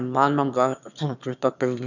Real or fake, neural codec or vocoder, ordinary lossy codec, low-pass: fake; autoencoder, 22.05 kHz, a latent of 192 numbers a frame, VITS, trained on one speaker; none; 7.2 kHz